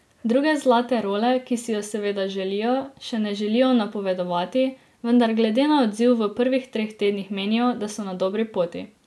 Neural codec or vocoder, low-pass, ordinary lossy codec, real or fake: none; none; none; real